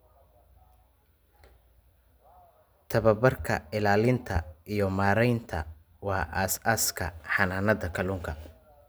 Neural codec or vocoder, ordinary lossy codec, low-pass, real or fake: none; none; none; real